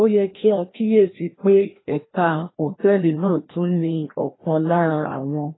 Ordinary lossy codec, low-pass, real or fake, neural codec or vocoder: AAC, 16 kbps; 7.2 kHz; fake; codec, 16 kHz, 1 kbps, FreqCodec, larger model